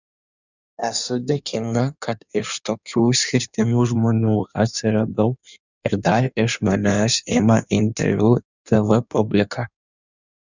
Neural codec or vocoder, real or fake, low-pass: codec, 16 kHz in and 24 kHz out, 1.1 kbps, FireRedTTS-2 codec; fake; 7.2 kHz